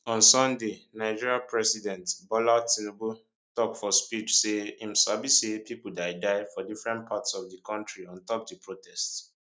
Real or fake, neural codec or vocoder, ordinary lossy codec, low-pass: real; none; none; none